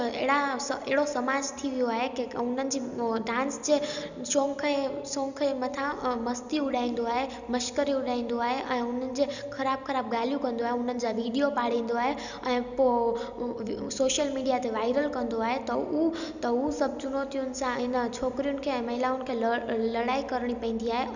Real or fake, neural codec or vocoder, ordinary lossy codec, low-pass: real; none; none; 7.2 kHz